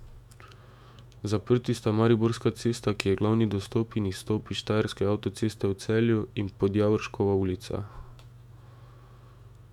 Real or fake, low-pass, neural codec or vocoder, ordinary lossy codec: fake; 19.8 kHz; autoencoder, 48 kHz, 128 numbers a frame, DAC-VAE, trained on Japanese speech; none